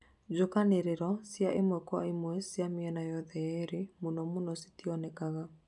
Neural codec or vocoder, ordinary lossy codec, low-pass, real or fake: none; none; 10.8 kHz; real